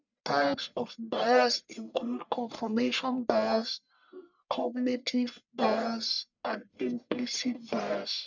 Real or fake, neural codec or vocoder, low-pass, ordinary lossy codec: fake; codec, 44.1 kHz, 1.7 kbps, Pupu-Codec; 7.2 kHz; none